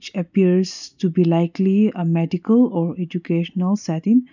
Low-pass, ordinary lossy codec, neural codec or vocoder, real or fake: 7.2 kHz; none; none; real